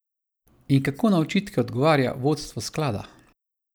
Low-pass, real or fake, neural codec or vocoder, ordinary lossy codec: none; real; none; none